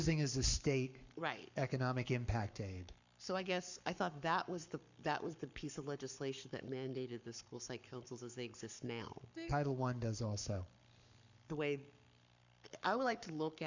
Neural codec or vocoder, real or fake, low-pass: codec, 44.1 kHz, 7.8 kbps, Pupu-Codec; fake; 7.2 kHz